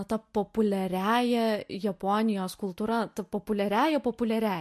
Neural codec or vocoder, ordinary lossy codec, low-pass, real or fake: none; MP3, 64 kbps; 14.4 kHz; real